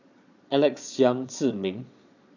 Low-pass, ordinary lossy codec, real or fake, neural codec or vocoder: 7.2 kHz; none; fake; vocoder, 22.05 kHz, 80 mel bands, Vocos